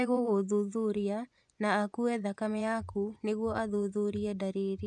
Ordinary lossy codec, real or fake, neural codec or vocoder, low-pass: none; fake; vocoder, 24 kHz, 100 mel bands, Vocos; 10.8 kHz